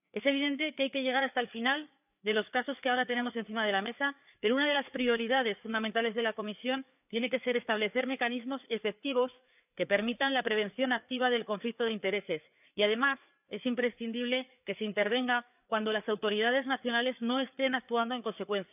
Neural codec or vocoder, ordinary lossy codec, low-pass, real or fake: codec, 16 kHz, 4 kbps, FreqCodec, larger model; none; 3.6 kHz; fake